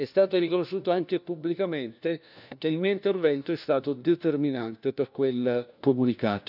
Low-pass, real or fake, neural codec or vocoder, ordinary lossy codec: 5.4 kHz; fake; codec, 16 kHz, 1 kbps, FunCodec, trained on LibriTTS, 50 frames a second; none